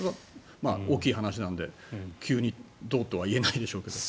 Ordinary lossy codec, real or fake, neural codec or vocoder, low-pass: none; real; none; none